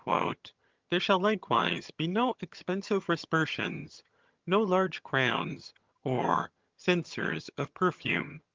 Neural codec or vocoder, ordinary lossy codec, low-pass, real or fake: vocoder, 22.05 kHz, 80 mel bands, HiFi-GAN; Opus, 32 kbps; 7.2 kHz; fake